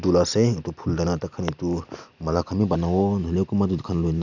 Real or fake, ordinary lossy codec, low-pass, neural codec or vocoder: fake; none; 7.2 kHz; vocoder, 44.1 kHz, 128 mel bands every 256 samples, BigVGAN v2